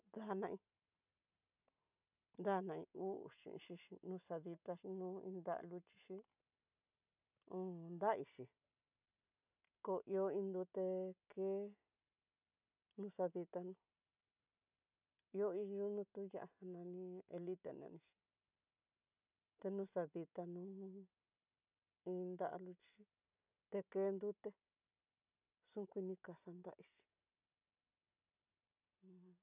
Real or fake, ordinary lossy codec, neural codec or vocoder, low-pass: real; none; none; 3.6 kHz